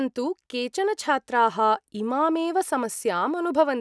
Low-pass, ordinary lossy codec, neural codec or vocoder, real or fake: none; none; none; real